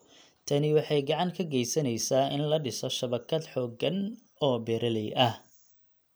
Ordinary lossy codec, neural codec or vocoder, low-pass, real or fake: none; none; none; real